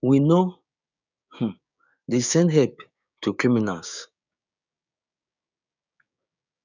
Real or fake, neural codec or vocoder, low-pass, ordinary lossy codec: fake; codec, 16 kHz, 6 kbps, DAC; 7.2 kHz; none